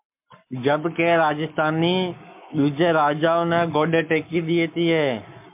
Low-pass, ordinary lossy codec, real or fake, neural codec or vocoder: 3.6 kHz; MP3, 32 kbps; fake; codec, 44.1 kHz, 7.8 kbps, Pupu-Codec